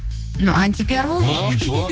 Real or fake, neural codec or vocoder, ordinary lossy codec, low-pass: fake; codec, 16 kHz, 2 kbps, X-Codec, HuBERT features, trained on general audio; none; none